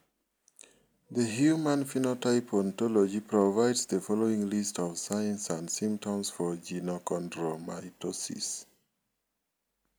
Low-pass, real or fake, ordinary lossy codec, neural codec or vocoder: none; real; none; none